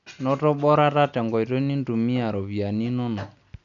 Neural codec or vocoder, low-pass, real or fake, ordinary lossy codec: none; 7.2 kHz; real; none